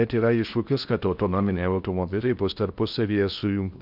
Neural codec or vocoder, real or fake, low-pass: codec, 16 kHz in and 24 kHz out, 0.6 kbps, FocalCodec, streaming, 4096 codes; fake; 5.4 kHz